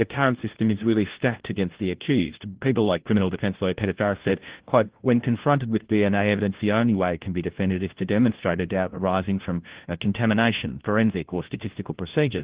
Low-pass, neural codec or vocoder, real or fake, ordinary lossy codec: 3.6 kHz; codec, 16 kHz, 1 kbps, FunCodec, trained on LibriTTS, 50 frames a second; fake; Opus, 16 kbps